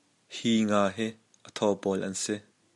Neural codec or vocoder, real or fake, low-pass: none; real; 10.8 kHz